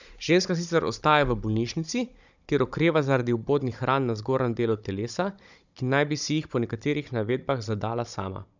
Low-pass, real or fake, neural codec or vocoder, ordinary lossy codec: 7.2 kHz; fake; codec, 16 kHz, 16 kbps, FunCodec, trained on Chinese and English, 50 frames a second; none